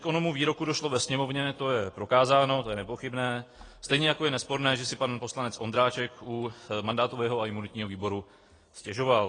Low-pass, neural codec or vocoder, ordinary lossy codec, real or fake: 9.9 kHz; none; AAC, 32 kbps; real